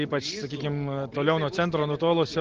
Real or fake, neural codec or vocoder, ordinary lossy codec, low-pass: real; none; Opus, 16 kbps; 7.2 kHz